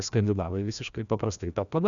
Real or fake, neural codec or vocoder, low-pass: fake; codec, 16 kHz, 1 kbps, FreqCodec, larger model; 7.2 kHz